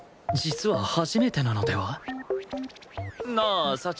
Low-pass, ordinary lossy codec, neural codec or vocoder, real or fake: none; none; none; real